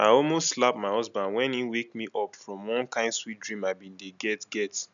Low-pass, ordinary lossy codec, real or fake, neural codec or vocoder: 7.2 kHz; none; real; none